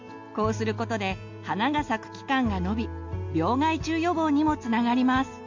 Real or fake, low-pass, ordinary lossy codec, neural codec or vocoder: real; 7.2 kHz; MP3, 48 kbps; none